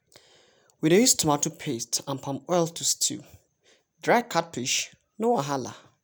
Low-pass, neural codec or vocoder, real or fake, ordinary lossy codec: none; none; real; none